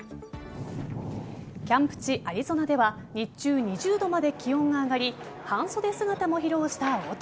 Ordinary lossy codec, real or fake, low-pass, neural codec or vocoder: none; real; none; none